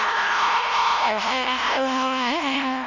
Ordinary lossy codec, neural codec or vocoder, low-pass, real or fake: none; codec, 16 kHz, 0.5 kbps, FunCodec, trained on LibriTTS, 25 frames a second; 7.2 kHz; fake